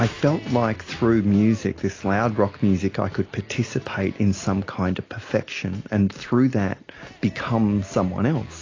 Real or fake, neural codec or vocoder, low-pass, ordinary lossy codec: real; none; 7.2 kHz; AAC, 32 kbps